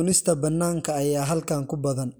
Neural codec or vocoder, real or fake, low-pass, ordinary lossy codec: none; real; none; none